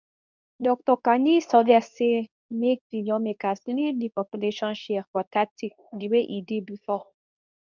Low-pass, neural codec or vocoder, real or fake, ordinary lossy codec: 7.2 kHz; codec, 24 kHz, 0.9 kbps, WavTokenizer, medium speech release version 2; fake; none